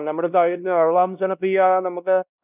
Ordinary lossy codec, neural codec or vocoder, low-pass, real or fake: none; codec, 16 kHz, 1 kbps, X-Codec, WavLM features, trained on Multilingual LibriSpeech; 3.6 kHz; fake